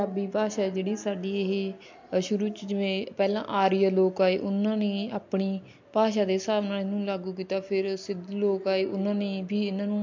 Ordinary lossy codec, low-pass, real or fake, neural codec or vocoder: MP3, 48 kbps; 7.2 kHz; real; none